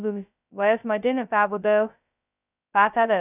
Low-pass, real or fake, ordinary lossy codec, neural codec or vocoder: 3.6 kHz; fake; none; codec, 16 kHz, 0.2 kbps, FocalCodec